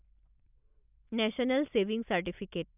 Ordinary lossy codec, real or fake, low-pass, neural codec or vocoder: none; real; 3.6 kHz; none